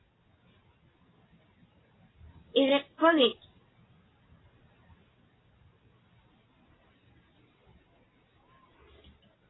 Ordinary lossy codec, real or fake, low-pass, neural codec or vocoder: AAC, 16 kbps; real; 7.2 kHz; none